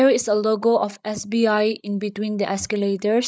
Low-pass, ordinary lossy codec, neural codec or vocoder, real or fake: none; none; codec, 16 kHz, 8 kbps, FreqCodec, larger model; fake